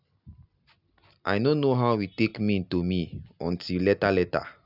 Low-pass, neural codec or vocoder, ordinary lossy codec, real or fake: 5.4 kHz; none; none; real